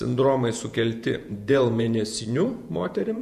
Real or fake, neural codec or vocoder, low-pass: fake; vocoder, 48 kHz, 128 mel bands, Vocos; 14.4 kHz